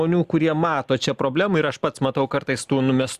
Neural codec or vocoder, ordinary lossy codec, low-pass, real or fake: none; Opus, 64 kbps; 14.4 kHz; real